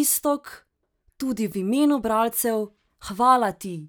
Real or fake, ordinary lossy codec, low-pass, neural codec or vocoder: real; none; none; none